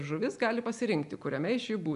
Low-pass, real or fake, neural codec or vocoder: 10.8 kHz; real; none